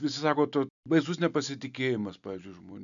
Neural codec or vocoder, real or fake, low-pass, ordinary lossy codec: none; real; 7.2 kHz; MP3, 96 kbps